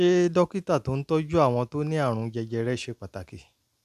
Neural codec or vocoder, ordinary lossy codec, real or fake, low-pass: none; none; real; 14.4 kHz